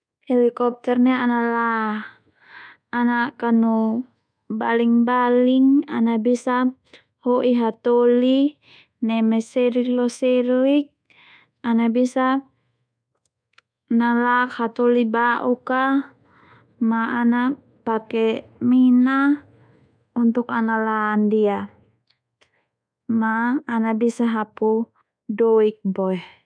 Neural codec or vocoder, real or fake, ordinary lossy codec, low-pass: codec, 24 kHz, 1.2 kbps, DualCodec; fake; none; 9.9 kHz